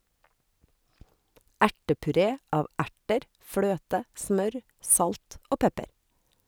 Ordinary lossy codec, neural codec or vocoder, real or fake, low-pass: none; none; real; none